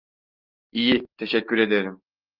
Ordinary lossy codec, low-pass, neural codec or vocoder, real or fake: Opus, 24 kbps; 5.4 kHz; none; real